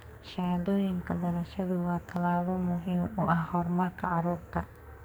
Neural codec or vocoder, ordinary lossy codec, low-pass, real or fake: codec, 44.1 kHz, 2.6 kbps, SNAC; none; none; fake